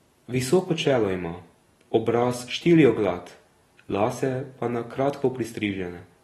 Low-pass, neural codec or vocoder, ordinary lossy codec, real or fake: 19.8 kHz; none; AAC, 32 kbps; real